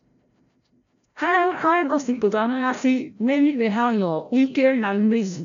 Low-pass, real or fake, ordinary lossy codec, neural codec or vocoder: 7.2 kHz; fake; none; codec, 16 kHz, 0.5 kbps, FreqCodec, larger model